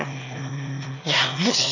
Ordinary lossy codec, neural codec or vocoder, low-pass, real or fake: none; autoencoder, 22.05 kHz, a latent of 192 numbers a frame, VITS, trained on one speaker; 7.2 kHz; fake